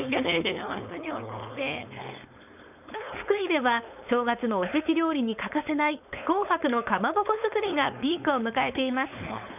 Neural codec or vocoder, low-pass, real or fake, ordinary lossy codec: codec, 16 kHz, 4.8 kbps, FACodec; 3.6 kHz; fake; none